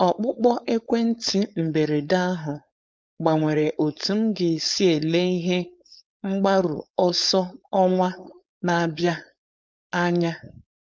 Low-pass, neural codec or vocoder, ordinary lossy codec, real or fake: none; codec, 16 kHz, 4.8 kbps, FACodec; none; fake